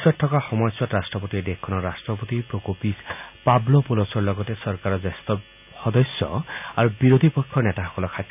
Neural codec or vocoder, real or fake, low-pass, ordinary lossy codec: none; real; 3.6 kHz; none